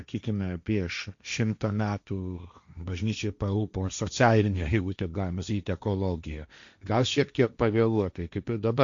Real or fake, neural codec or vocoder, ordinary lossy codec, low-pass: fake; codec, 16 kHz, 1.1 kbps, Voila-Tokenizer; MP3, 64 kbps; 7.2 kHz